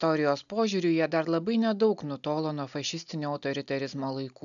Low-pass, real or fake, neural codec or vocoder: 7.2 kHz; real; none